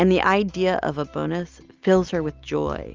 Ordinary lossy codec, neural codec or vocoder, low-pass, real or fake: Opus, 32 kbps; none; 7.2 kHz; real